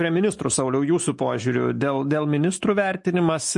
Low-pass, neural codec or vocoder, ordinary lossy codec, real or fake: 10.8 kHz; none; MP3, 48 kbps; real